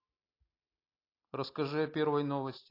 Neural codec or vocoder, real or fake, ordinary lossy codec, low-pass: none; real; AAC, 24 kbps; 5.4 kHz